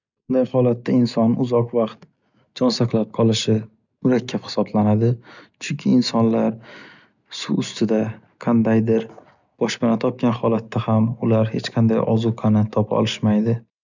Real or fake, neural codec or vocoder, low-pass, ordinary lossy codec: real; none; 7.2 kHz; none